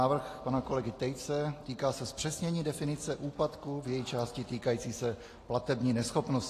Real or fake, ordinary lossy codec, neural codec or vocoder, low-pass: real; AAC, 48 kbps; none; 14.4 kHz